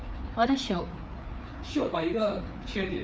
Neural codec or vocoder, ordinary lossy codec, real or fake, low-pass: codec, 16 kHz, 4 kbps, FreqCodec, larger model; none; fake; none